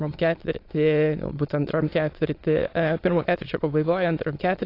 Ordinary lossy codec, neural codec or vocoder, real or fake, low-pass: AAC, 32 kbps; autoencoder, 22.05 kHz, a latent of 192 numbers a frame, VITS, trained on many speakers; fake; 5.4 kHz